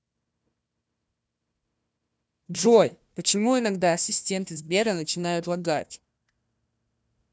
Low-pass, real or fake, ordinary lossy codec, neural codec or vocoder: none; fake; none; codec, 16 kHz, 1 kbps, FunCodec, trained on Chinese and English, 50 frames a second